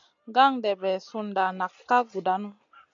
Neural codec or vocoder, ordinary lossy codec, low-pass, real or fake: none; MP3, 64 kbps; 7.2 kHz; real